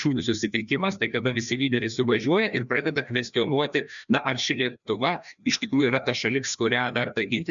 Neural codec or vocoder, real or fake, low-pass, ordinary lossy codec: codec, 16 kHz, 1 kbps, FreqCodec, larger model; fake; 7.2 kHz; MP3, 96 kbps